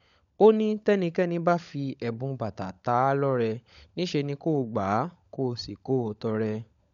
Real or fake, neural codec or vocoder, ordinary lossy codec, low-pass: fake; codec, 16 kHz, 16 kbps, FunCodec, trained on LibriTTS, 50 frames a second; none; 7.2 kHz